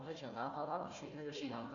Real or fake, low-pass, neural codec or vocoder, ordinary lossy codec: fake; 7.2 kHz; codec, 16 kHz, 1 kbps, FunCodec, trained on Chinese and English, 50 frames a second; MP3, 64 kbps